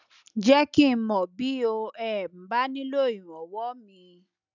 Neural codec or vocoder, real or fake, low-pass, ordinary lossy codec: none; real; 7.2 kHz; none